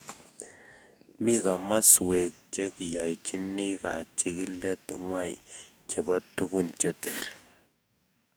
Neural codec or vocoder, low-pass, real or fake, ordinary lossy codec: codec, 44.1 kHz, 2.6 kbps, DAC; none; fake; none